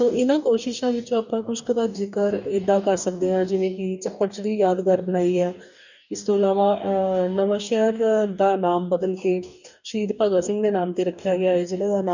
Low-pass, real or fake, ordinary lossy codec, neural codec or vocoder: 7.2 kHz; fake; none; codec, 44.1 kHz, 2.6 kbps, DAC